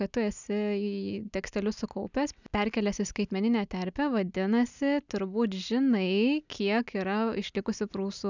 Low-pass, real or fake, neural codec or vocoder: 7.2 kHz; real; none